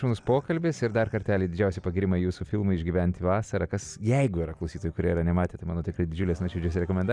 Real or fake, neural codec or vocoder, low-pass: real; none; 9.9 kHz